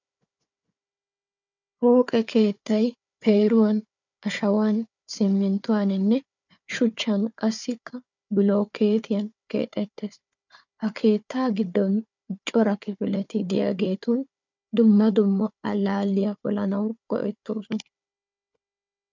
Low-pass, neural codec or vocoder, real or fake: 7.2 kHz; codec, 16 kHz, 4 kbps, FunCodec, trained on Chinese and English, 50 frames a second; fake